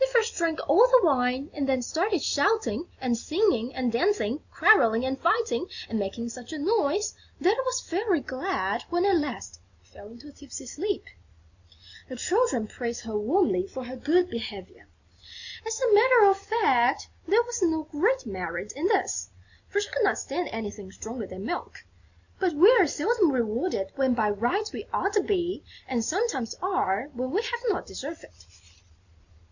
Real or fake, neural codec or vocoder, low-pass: real; none; 7.2 kHz